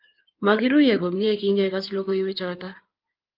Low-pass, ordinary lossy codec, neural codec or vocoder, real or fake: 5.4 kHz; Opus, 32 kbps; codec, 16 kHz in and 24 kHz out, 2.2 kbps, FireRedTTS-2 codec; fake